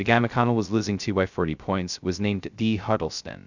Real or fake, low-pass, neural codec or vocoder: fake; 7.2 kHz; codec, 16 kHz, 0.2 kbps, FocalCodec